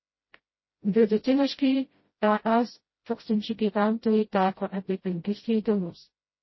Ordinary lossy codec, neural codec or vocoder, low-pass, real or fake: MP3, 24 kbps; codec, 16 kHz, 0.5 kbps, FreqCodec, smaller model; 7.2 kHz; fake